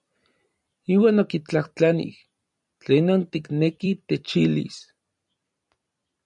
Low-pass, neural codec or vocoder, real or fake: 10.8 kHz; vocoder, 44.1 kHz, 128 mel bands every 256 samples, BigVGAN v2; fake